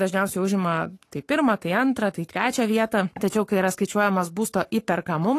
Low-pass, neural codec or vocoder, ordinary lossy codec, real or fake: 14.4 kHz; codec, 44.1 kHz, 7.8 kbps, Pupu-Codec; AAC, 48 kbps; fake